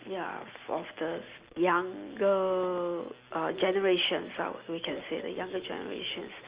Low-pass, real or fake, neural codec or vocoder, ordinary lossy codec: 3.6 kHz; real; none; Opus, 24 kbps